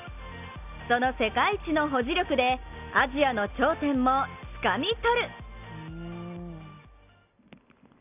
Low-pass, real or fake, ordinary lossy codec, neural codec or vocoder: 3.6 kHz; real; none; none